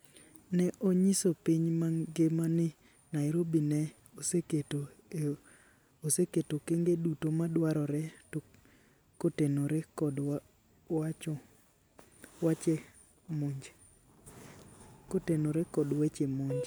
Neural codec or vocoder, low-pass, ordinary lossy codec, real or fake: none; none; none; real